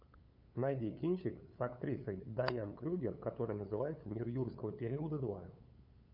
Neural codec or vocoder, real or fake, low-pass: codec, 16 kHz, 8 kbps, FunCodec, trained on LibriTTS, 25 frames a second; fake; 5.4 kHz